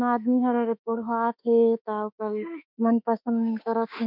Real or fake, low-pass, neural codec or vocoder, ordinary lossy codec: fake; 5.4 kHz; autoencoder, 48 kHz, 32 numbers a frame, DAC-VAE, trained on Japanese speech; none